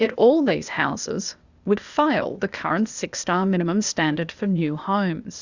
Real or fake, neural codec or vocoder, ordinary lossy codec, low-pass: fake; codec, 16 kHz, 0.8 kbps, ZipCodec; Opus, 64 kbps; 7.2 kHz